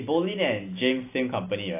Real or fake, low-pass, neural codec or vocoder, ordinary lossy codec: real; 3.6 kHz; none; AAC, 24 kbps